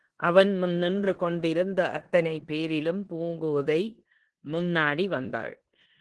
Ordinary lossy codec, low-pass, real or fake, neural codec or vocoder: Opus, 16 kbps; 10.8 kHz; fake; codec, 16 kHz in and 24 kHz out, 0.9 kbps, LongCat-Audio-Codec, four codebook decoder